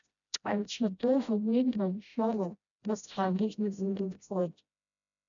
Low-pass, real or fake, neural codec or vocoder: 7.2 kHz; fake; codec, 16 kHz, 0.5 kbps, FreqCodec, smaller model